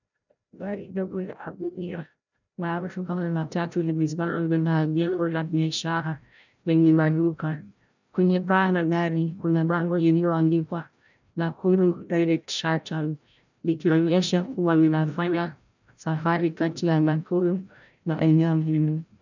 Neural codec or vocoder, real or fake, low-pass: codec, 16 kHz, 0.5 kbps, FreqCodec, larger model; fake; 7.2 kHz